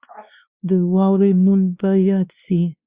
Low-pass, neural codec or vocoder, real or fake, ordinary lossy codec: 3.6 kHz; codec, 16 kHz, 2 kbps, X-Codec, WavLM features, trained on Multilingual LibriSpeech; fake; Opus, 64 kbps